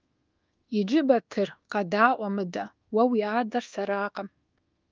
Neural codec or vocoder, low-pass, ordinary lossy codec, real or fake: codec, 24 kHz, 1.2 kbps, DualCodec; 7.2 kHz; Opus, 32 kbps; fake